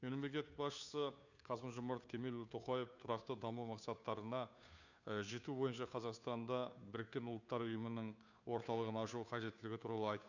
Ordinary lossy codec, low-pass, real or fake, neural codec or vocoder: none; 7.2 kHz; fake; codec, 16 kHz, 2 kbps, FunCodec, trained on Chinese and English, 25 frames a second